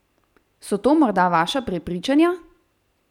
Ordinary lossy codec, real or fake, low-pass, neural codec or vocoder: none; real; 19.8 kHz; none